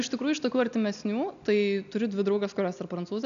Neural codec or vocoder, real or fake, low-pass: none; real; 7.2 kHz